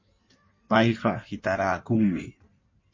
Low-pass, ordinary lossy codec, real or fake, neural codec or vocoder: 7.2 kHz; MP3, 32 kbps; fake; codec, 16 kHz in and 24 kHz out, 1.1 kbps, FireRedTTS-2 codec